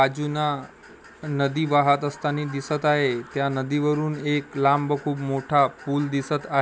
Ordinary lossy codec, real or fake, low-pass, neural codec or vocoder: none; real; none; none